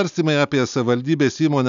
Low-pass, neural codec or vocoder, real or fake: 7.2 kHz; none; real